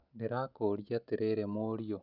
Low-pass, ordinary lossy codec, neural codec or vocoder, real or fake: 5.4 kHz; none; none; real